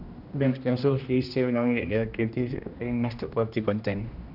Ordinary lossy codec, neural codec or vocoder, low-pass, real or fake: none; codec, 16 kHz, 1 kbps, X-Codec, HuBERT features, trained on general audio; 5.4 kHz; fake